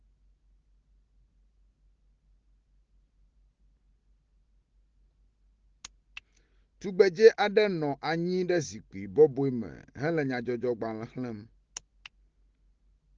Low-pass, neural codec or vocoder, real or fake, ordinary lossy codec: 7.2 kHz; none; real; Opus, 16 kbps